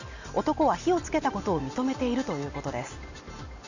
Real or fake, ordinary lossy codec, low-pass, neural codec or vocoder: real; none; 7.2 kHz; none